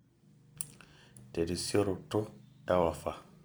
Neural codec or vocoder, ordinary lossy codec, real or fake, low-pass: vocoder, 44.1 kHz, 128 mel bands every 256 samples, BigVGAN v2; none; fake; none